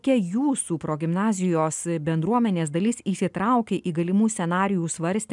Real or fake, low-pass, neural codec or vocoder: real; 10.8 kHz; none